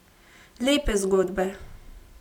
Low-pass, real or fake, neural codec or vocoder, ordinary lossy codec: 19.8 kHz; fake; vocoder, 44.1 kHz, 128 mel bands every 512 samples, BigVGAN v2; none